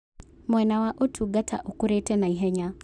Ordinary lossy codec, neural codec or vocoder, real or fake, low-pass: none; none; real; 9.9 kHz